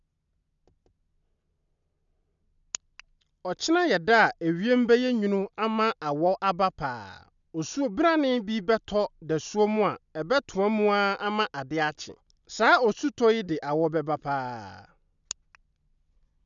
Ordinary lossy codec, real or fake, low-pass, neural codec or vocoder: none; real; 7.2 kHz; none